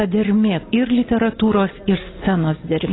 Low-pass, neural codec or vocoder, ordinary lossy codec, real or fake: 7.2 kHz; none; AAC, 16 kbps; real